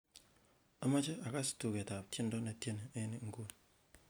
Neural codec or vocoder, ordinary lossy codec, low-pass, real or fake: none; none; none; real